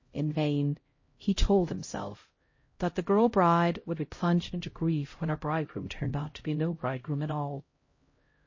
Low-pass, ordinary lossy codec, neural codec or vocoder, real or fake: 7.2 kHz; MP3, 32 kbps; codec, 16 kHz, 0.5 kbps, X-Codec, HuBERT features, trained on LibriSpeech; fake